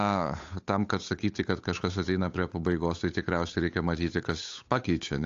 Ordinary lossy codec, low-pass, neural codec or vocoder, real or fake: AAC, 48 kbps; 7.2 kHz; codec, 16 kHz, 8 kbps, FunCodec, trained on Chinese and English, 25 frames a second; fake